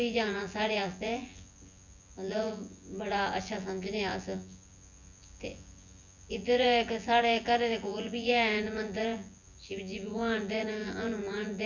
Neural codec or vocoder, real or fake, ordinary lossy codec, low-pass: vocoder, 24 kHz, 100 mel bands, Vocos; fake; none; 7.2 kHz